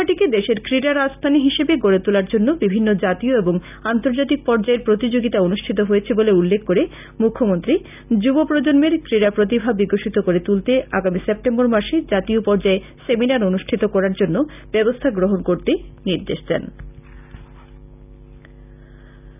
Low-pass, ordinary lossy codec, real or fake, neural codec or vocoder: 3.6 kHz; none; real; none